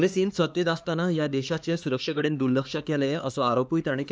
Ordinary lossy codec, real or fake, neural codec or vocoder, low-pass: none; fake; codec, 16 kHz, 2 kbps, X-Codec, HuBERT features, trained on LibriSpeech; none